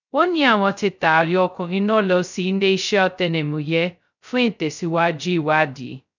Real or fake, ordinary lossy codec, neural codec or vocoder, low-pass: fake; none; codec, 16 kHz, 0.2 kbps, FocalCodec; 7.2 kHz